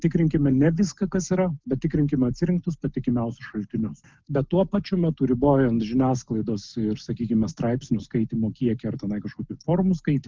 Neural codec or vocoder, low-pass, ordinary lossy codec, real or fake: none; 7.2 kHz; Opus, 32 kbps; real